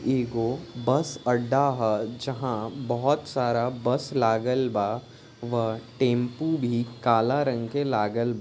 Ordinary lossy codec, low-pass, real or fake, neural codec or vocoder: none; none; real; none